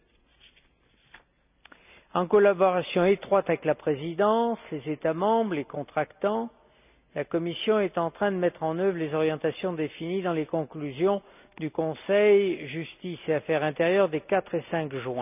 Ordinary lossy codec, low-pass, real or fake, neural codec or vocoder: none; 3.6 kHz; real; none